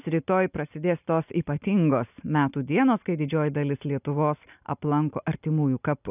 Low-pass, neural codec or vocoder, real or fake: 3.6 kHz; none; real